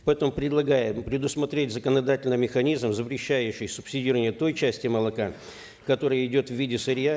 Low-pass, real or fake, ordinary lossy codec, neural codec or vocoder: none; real; none; none